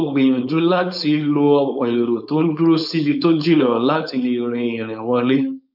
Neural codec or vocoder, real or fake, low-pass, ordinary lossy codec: codec, 16 kHz, 4.8 kbps, FACodec; fake; 5.4 kHz; none